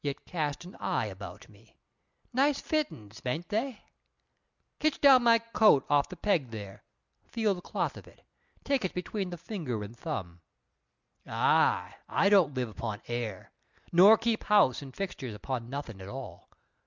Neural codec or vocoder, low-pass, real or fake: none; 7.2 kHz; real